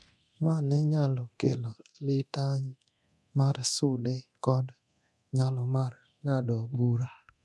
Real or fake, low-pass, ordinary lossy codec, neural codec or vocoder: fake; none; none; codec, 24 kHz, 0.9 kbps, DualCodec